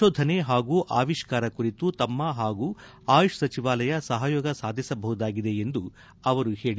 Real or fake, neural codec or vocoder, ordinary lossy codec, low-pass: real; none; none; none